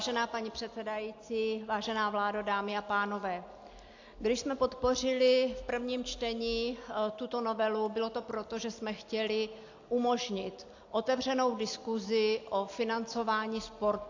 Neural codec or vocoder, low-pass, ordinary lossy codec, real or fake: none; 7.2 kHz; AAC, 48 kbps; real